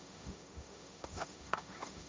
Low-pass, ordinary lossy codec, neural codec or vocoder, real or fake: none; none; codec, 16 kHz, 1.1 kbps, Voila-Tokenizer; fake